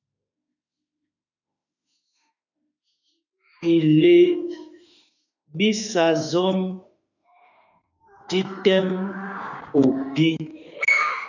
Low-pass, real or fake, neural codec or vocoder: 7.2 kHz; fake; autoencoder, 48 kHz, 32 numbers a frame, DAC-VAE, trained on Japanese speech